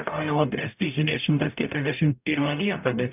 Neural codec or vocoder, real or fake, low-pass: codec, 44.1 kHz, 0.9 kbps, DAC; fake; 3.6 kHz